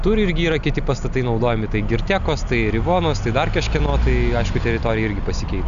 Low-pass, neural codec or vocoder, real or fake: 7.2 kHz; none; real